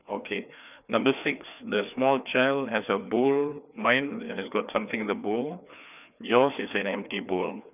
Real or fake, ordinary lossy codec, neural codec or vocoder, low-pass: fake; none; codec, 16 kHz, 2 kbps, FreqCodec, larger model; 3.6 kHz